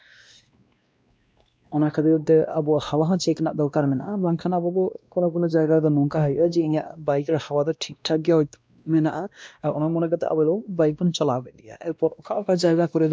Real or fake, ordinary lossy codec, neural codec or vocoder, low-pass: fake; none; codec, 16 kHz, 1 kbps, X-Codec, WavLM features, trained on Multilingual LibriSpeech; none